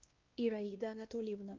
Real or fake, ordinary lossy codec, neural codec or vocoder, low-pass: fake; Opus, 24 kbps; codec, 16 kHz, 1 kbps, X-Codec, WavLM features, trained on Multilingual LibriSpeech; 7.2 kHz